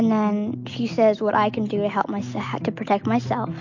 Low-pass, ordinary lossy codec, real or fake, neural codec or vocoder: 7.2 kHz; MP3, 48 kbps; real; none